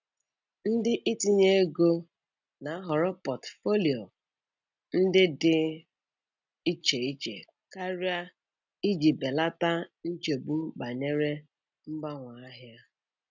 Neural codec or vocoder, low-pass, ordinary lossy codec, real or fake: none; 7.2 kHz; none; real